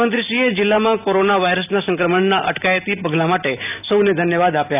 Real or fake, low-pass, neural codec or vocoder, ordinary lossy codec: real; 3.6 kHz; none; none